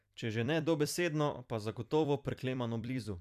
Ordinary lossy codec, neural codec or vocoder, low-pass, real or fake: none; vocoder, 44.1 kHz, 128 mel bands every 256 samples, BigVGAN v2; 14.4 kHz; fake